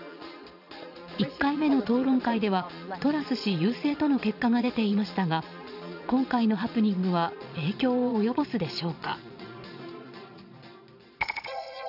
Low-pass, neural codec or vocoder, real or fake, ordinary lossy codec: 5.4 kHz; vocoder, 22.05 kHz, 80 mel bands, Vocos; fake; none